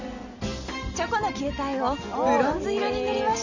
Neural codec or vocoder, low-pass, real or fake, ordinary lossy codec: none; 7.2 kHz; real; none